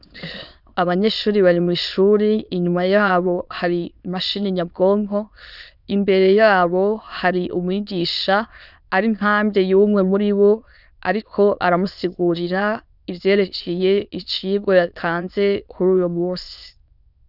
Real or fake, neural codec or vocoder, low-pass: fake; autoencoder, 22.05 kHz, a latent of 192 numbers a frame, VITS, trained on many speakers; 5.4 kHz